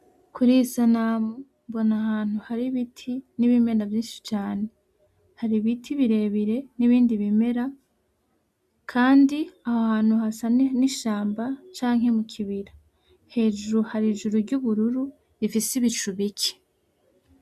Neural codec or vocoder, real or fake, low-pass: none; real; 14.4 kHz